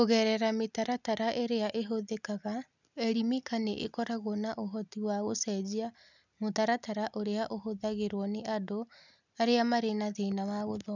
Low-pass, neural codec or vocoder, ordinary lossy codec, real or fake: 7.2 kHz; none; none; real